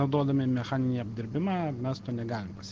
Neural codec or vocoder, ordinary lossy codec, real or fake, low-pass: none; Opus, 16 kbps; real; 7.2 kHz